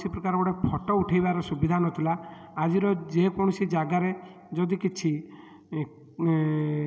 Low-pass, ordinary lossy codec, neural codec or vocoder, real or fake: none; none; none; real